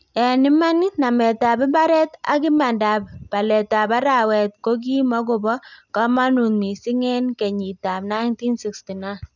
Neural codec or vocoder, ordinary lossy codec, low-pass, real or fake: none; none; 7.2 kHz; real